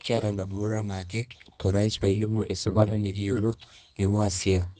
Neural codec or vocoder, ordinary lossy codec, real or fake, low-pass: codec, 24 kHz, 0.9 kbps, WavTokenizer, medium music audio release; none; fake; 10.8 kHz